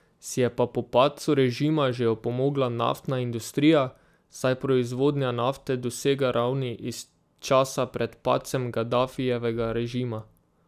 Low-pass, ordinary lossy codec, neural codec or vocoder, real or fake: 14.4 kHz; none; none; real